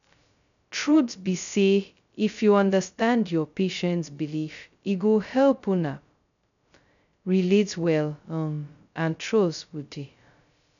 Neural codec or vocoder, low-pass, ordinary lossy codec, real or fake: codec, 16 kHz, 0.2 kbps, FocalCodec; 7.2 kHz; none; fake